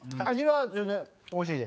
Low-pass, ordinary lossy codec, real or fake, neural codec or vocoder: none; none; fake; codec, 16 kHz, 4 kbps, X-Codec, HuBERT features, trained on general audio